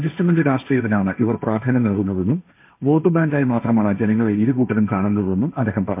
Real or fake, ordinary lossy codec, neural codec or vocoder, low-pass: fake; MP3, 24 kbps; codec, 16 kHz, 1.1 kbps, Voila-Tokenizer; 3.6 kHz